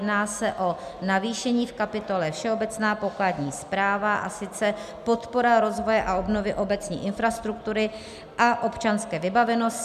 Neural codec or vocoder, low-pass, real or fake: none; 14.4 kHz; real